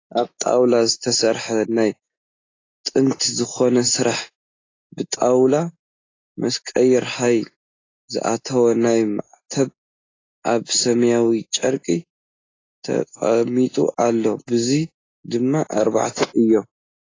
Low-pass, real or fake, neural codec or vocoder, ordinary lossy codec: 7.2 kHz; real; none; AAC, 32 kbps